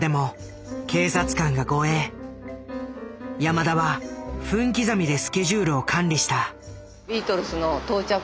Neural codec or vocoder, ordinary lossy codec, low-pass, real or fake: none; none; none; real